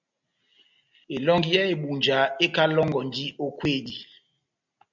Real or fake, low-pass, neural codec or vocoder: real; 7.2 kHz; none